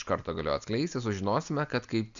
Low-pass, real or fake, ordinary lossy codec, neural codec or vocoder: 7.2 kHz; real; MP3, 96 kbps; none